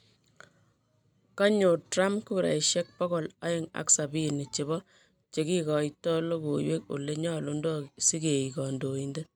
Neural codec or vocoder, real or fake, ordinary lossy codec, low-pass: none; real; none; 19.8 kHz